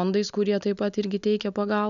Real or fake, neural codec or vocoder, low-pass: real; none; 7.2 kHz